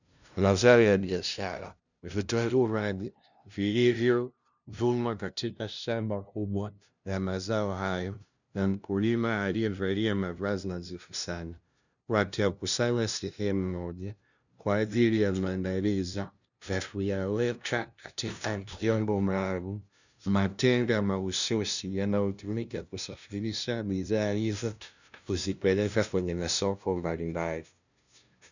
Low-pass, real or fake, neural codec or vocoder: 7.2 kHz; fake; codec, 16 kHz, 0.5 kbps, FunCodec, trained on LibriTTS, 25 frames a second